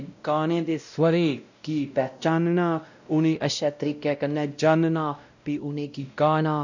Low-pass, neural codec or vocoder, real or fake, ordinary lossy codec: 7.2 kHz; codec, 16 kHz, 0.5 kbps, X-Codec, WavLM features, trained on Multilingual LibriSpeech; fake; none